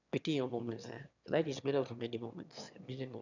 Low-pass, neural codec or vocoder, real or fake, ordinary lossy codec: 7.2 kHz; autoencoder, 22.05 kHz, a latent of 192 numbers a frame, VITS, trained on one speaker; fake; none